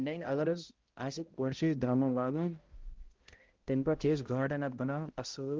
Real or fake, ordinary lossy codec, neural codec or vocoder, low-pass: fake; Opus, 16 kbps; codec, 16 kHz, 0.5 kbps, X-Codec, HuBERT features, trained on balanced general audio; 7.2 kHz